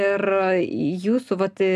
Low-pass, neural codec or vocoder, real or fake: 14.4 kHz; none; real